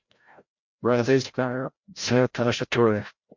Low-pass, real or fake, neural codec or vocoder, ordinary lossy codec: 7.2 kHz; fake; codec, 16 kHz, 0.5 kbps, FreqCodec, larger model; MP3, 48 kbps